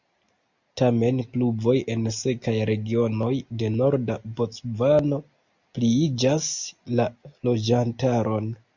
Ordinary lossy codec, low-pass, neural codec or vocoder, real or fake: Opus, 64 kbps; 7.2 kHz; none; real